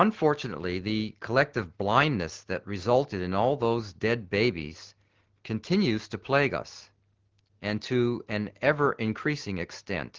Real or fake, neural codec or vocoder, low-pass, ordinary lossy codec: real; none; 7.2 kHz; Opus, 16 kbps